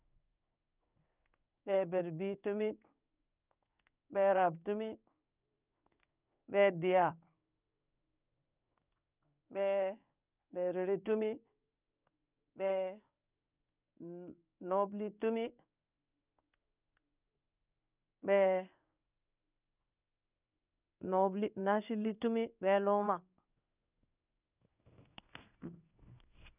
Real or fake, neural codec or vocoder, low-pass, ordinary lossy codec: fake; codec, 16 kHz in and 24 kHz out, 1 kbps, XY-Tokenizer; 3.6 kHz; none